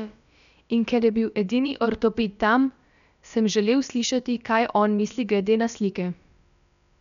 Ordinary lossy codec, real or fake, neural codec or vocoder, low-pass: none; fake; codec, 16 kHz, about 1 kbps, DyCAST, with the encoder's durations; 7.2 kHz